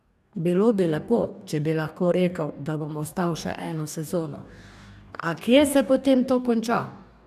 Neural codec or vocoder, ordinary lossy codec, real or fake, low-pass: codec, 44.1 kHz, 2.6 kbps, DAC; none; fake; 14.4 kHz